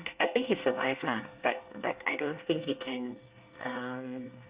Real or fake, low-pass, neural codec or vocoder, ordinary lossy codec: fake; 3.6 kHz; codec, 24 kHz, 1 kbps, SNAC; Opus, 64 kbps